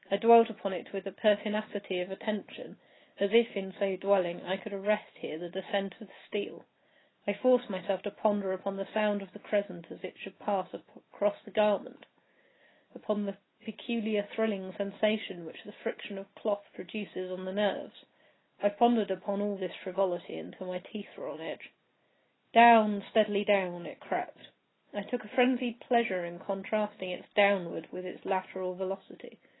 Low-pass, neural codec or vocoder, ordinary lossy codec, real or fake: 7.2 kHz; none; AAC, 16 kbps; real